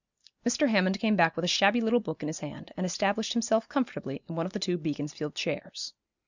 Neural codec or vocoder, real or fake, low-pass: none; real; 7.2 kHz